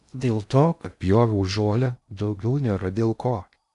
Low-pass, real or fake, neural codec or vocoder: 10.8 kHz; fake; codec, 16 kHz in and 24 kHz out, 0.6 kbps, FocalCodec, streaming, 2048 codes